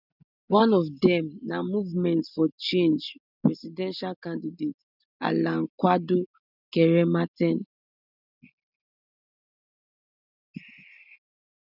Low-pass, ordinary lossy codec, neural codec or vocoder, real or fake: 5.4 kHz; none; none; real